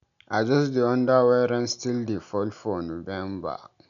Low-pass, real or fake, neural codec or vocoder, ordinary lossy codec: 7.2 kHz; real; none; none